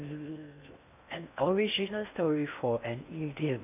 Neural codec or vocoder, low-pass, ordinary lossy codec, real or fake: codec, 16 kHz in and 24 kHz out, 0.6 kbps, FocalCodec, streaming, 4096 codes; 3.6 kHz; none; fake